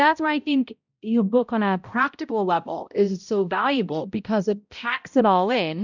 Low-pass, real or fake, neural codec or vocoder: 7.2 kHz; fake; codec, 16 kHz, 0.5 kbps, X-Codec, HuBERT features, trained on balanced general audio